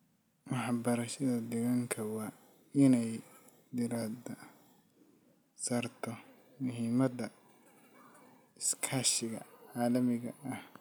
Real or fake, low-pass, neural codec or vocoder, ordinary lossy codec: real; none; none; none